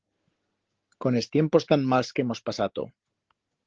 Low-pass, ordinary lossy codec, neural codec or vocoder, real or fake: 7.2 kHz; Opus, 16 kbps; none; real